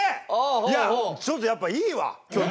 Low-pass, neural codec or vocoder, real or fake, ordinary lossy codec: none; none; real; none